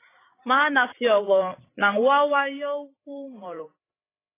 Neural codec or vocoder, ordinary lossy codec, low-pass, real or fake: codec, 16 kHz, 8 kbps, FreqCodec, larger model; AAC, 16 kbps; 3.6 kHz; fake